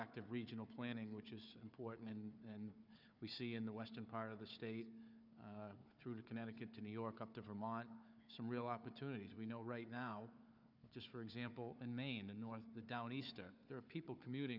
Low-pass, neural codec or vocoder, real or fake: 5.4 kHz; codec, 16 kHz, 4 kbps, FunCodec, trained on Chinese and English, 50 frames a second; fake